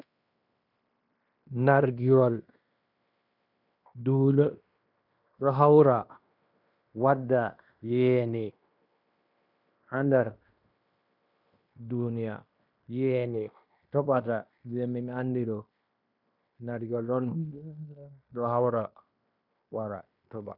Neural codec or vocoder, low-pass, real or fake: codec, 16 kHz in and 24 kHz out, 0.9 kbps, LongCat-Audio-Codec, fine tuned four codebook decoder; 5.4 kHz; fake